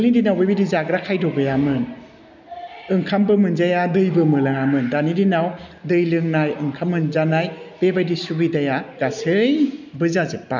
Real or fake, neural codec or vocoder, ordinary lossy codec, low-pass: real; none; none; 7.2 kHz